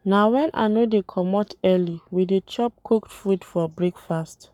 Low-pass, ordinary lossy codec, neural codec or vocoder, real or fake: 19.8 kHz; none; codec, 44.1 kHz, 7.8 kbps, Pupu-Codec; fake